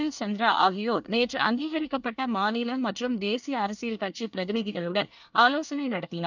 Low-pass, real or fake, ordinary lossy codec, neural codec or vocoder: 7.2 kHz; fake; none; codec, 24 kHz, 1 kbps, SNAC